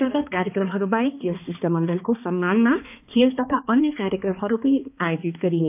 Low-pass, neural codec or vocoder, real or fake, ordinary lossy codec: 3.6 kHz; codec, 16 kHz, 2 kbps, X-Codec, HuBERT features, trained on balanced general audio; fake; none